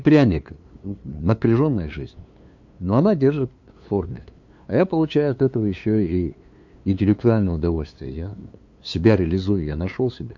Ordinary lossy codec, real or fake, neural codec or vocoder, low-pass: MP3, 64 kbps; fake; codec, 16 kHz, 2 kbps, FunCodec, trained on LibriTTS, 25 frames a second; 7.2 kHz